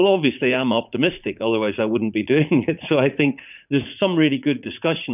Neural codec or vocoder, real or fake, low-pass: vocoder, 44.1 kHz, 80 mel bands, Vocos; fake; 3.6 kHz